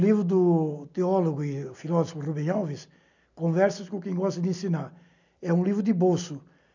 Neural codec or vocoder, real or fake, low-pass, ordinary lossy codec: none; real; 7.2 kHz; none